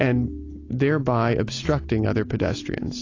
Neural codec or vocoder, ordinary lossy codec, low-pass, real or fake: none; AAC, 32 kbps; 7.2 kHz; real